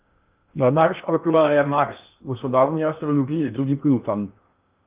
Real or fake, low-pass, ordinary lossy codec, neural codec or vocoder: fake; 3.6 kHz; Opus, 24 kbps; codec, 16 kHz in and 24 kHz out, 0.6 kbps, FocalCodec, streaming, 2048 codes